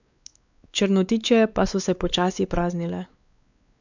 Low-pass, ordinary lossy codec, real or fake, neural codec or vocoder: 7.2 kHz; none; fake; codec, 16 kHz, 4 kbps, X-Codec, WavLM features, trained on Multilingual LibriSpeech